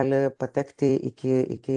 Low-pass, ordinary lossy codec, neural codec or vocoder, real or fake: 10.8 kHz; AAC, 64 kbps; vocoder, 44.1 kHz, 128 mel bands every 256 samples, BigVGAN v2; fake